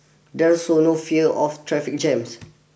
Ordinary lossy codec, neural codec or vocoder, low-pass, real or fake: none; none; none; real